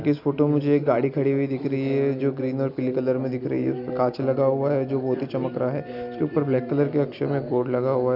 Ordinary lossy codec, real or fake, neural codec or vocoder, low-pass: none; real; none; 5.4 kHz